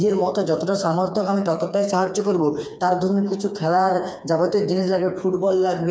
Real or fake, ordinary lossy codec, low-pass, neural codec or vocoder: fake; none; none; codec, 16 kHz, 4 kbps, FreqCodec, smaller model